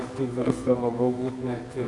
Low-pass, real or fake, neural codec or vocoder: 10.8 kHz; fake; codec, 24 kHz, 0.9 kbps, WavTokenizer, medium music audio release